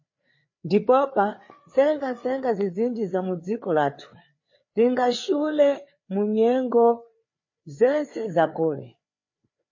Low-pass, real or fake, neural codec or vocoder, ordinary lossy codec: 7.2 kHz; fake; codec, 16 kHz, 4 kbps, FreqCodec, larger model; MP3, 32 kbps